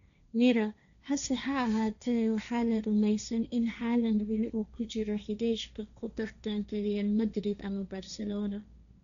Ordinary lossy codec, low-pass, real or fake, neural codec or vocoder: none; 7.2 kHz; fake; codec, 16 kHz, 1.1 kbps, Voila-Tokenizer